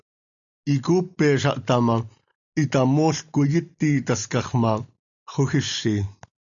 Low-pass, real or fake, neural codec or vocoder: 7.2 kHz; real; none